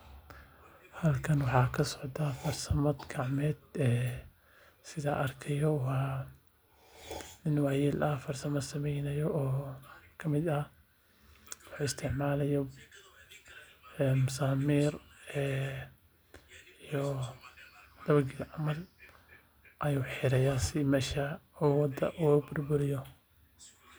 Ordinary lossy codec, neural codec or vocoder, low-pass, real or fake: none; none; none; real